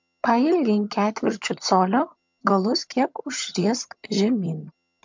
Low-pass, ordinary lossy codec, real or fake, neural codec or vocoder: 7.2 kHz; MP3, 64 kbps; fake; vocoder, 22.05 kHz, 80 mel bands, HiFi-GAN